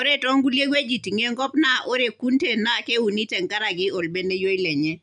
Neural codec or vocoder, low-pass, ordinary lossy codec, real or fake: none; 9.9 kHz; none; real